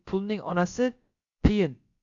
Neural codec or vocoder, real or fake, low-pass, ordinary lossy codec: codec, 16 kHz, about 1 kbps, DyCAST, with the encoder's durations; fake; 7.2 kHz; Opus, 64 kbps